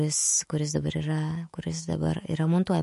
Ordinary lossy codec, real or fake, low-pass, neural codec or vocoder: MP3, 48 kbps; fake; 14.4 kHz; autoencoder, 48 kHz, 128 numbers a frame, DAC-VAE, trained on Japanese speech